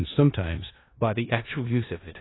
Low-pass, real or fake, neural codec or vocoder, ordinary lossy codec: 7.2 kHz; fake; codec, 16 kHz in and 24 kHz out, 0.4 kbps, LongCat-Audio-Codec, four codebook decoder; AAC, 16 kbps